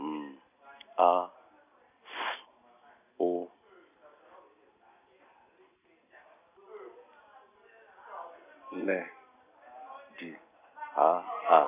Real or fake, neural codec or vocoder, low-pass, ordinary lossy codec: real; none; 3.6 kHz; none